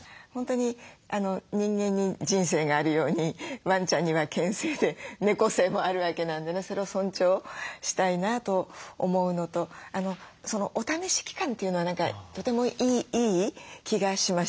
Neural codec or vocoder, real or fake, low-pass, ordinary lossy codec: none; real; none; none